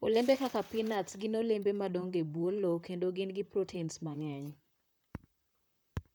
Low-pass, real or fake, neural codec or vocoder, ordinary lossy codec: none; fake; vocoder, 44.1 kHz, 128 mel bands, Pupu-Vocoder; none